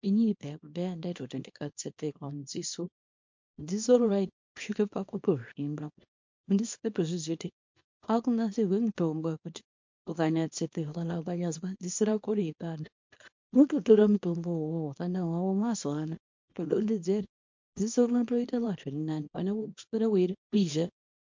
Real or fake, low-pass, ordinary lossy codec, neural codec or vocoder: fake; 7.2 kHz; MP3, 48 kbps; codec, 24 kHz, 0.9 kbps, WavTokenizer, small release